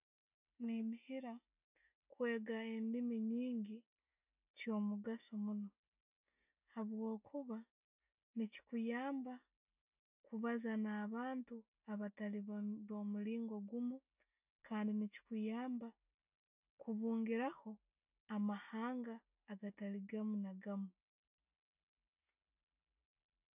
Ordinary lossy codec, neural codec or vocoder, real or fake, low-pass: none; none; real; 3.6 kHz